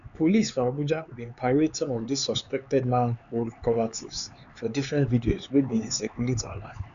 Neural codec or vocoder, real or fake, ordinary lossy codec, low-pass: codec, 16 kHz, 4 kbps, X-Codec, HuBERT features, trained on LibriSpeech; fake; none; 7.2 kHz